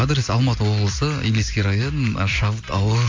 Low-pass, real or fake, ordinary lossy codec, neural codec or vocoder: 7.2 kHz; real; MP3, 48 kbps; none